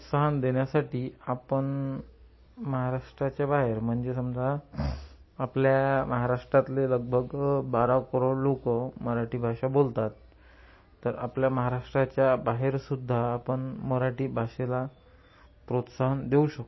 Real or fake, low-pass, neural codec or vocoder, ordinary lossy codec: real; 7.2 kHz; none; MP3, 24 kbps